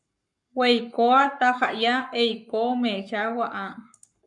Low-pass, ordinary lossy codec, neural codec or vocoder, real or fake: 10.8 kHz; MP3, 96 kbps; codec, 44.1 kHz, 7.8 kbps, Pupu-Codec; fake